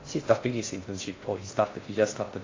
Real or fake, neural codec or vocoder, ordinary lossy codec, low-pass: fake; codec, 16 kHz in and 24 kHz out, 0.6 kbps, FocalCodec, streaming, 2048 codes; AAC, 32 kbps; 7.2 kHz